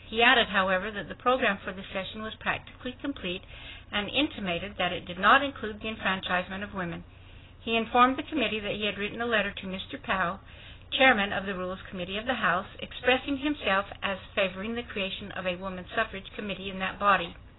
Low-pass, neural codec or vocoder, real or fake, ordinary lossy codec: 7.2 kHz; none; real; AAC, 16 kbps